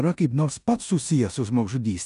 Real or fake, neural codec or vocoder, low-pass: fake; codec, 16 kHz in and 24 kHz out, 0.9 kbps, LongCat-Audio-Codec, four codebook decoder; 10.8 kHz